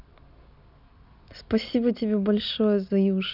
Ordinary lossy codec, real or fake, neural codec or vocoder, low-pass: none; real; none; 5.4 kHz